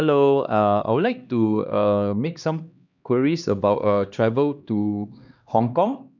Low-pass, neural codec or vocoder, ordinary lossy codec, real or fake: 7.2 kHz; codec, 16 kHz, 2 kbps, X-Codec, HuBERT features, trained on LibriSpeech; none; fake